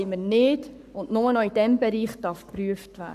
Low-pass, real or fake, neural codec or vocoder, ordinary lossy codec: 14.4 kHz; fake; codec, 44.1 kHz, 7.8 kbps, Pupu-Codec; none